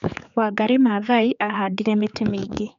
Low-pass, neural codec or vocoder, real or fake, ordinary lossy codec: 7.2 kHz; codec, 16 kHz, 4 kbps, X-Codec, HuBERT features, trained on general audio; fake; none